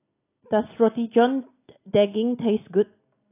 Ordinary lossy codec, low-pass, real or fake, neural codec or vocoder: AAC, 24 kbps; 3.6 kHz; real; none